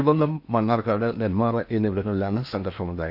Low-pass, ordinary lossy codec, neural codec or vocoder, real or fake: 5.4 kHz; AAC, 48 kbps; codec, 16 kHz in and 24 kHz out, 0.8 kbps, FocalCodec, streaming, 65536 codes; fake